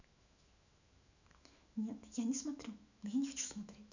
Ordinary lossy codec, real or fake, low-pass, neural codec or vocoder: none; fake; 7.2 kHz; autoencoder, 48 kHz, 128 numbers a frame, DAC-VAE, trained on Japanese speech